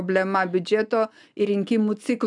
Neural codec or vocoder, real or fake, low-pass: none; real; 10.8 kHz